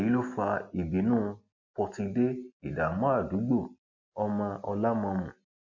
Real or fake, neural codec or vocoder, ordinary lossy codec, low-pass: real; none; MP3, 48 kbps; 7.2 kHz